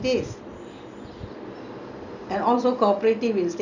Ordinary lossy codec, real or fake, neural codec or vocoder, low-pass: none; real; none; 7.2 kHz